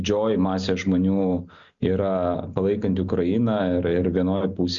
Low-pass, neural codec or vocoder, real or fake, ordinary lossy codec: 7.2 kHz; none; real; Opus, 64 kbps